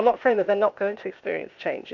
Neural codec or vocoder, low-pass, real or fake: codec, 16 kHz, 0.8 kbps, ZipCodec; 7.2 kHz; fake